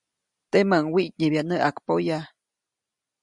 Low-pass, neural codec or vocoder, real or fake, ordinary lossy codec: 10.8 kHz; none; real; Opus, 64 kbps